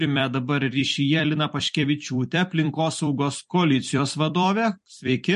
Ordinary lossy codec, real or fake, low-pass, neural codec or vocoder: MP3, 48 kbps; fake; 14.4 kHz; vocoder, 44.1 kHz, 128 mel bands every 256 samples, BigVGAN v2